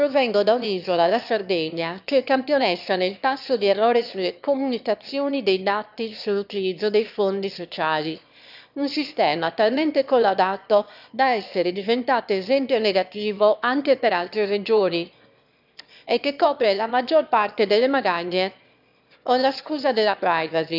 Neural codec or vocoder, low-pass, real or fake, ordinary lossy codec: autoencoder, 22.05 kHz, a latent of 192 numbers a frame, VITS, trained on one speaker; 5.4 kHz; fake; none